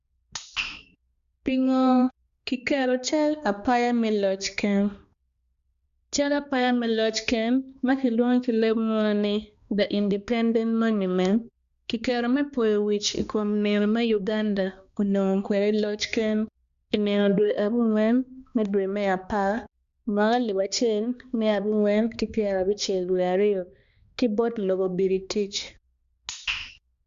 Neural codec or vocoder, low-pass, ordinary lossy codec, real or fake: codec, 16 kHz, 2 kbps, X-Codec, HuBERT features, trained on balanced general audio; 7.2 kHz; Opus, 64 kbps; fake